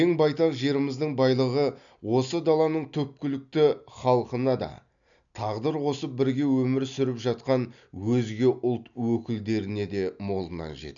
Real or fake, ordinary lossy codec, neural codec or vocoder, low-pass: real; none; none; 7.2 kHz